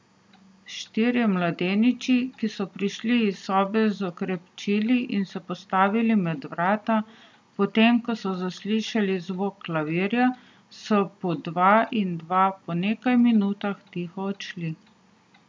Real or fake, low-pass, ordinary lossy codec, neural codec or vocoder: real; none; none; none